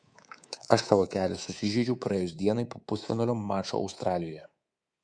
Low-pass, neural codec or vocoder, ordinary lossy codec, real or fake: 9.9 kHz; codec, 24 kHz, 3.1 kbps, DualCodec; Opus, 64 kbps; fake